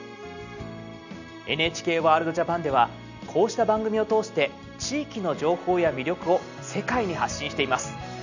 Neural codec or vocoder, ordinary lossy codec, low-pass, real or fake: none; none; 7.2 kHz; real